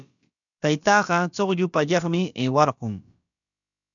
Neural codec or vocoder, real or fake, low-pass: codec, 16 kHz, about 1 kbps, DyCAST, with the encoder's durations; fake; 7.2 kHz